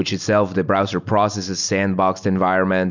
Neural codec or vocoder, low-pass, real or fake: none; 7.2 kHz; real